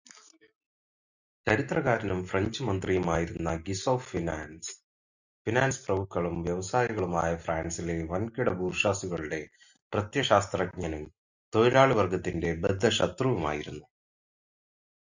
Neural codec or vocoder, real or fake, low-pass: none; real; 7.2 kHz